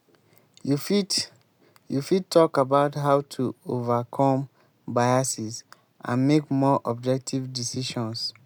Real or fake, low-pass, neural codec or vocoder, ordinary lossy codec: real; none; none; none